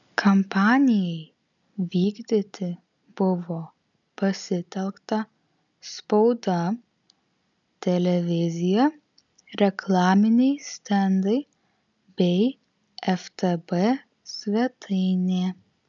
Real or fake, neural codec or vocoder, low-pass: real; none; 7.2 kHz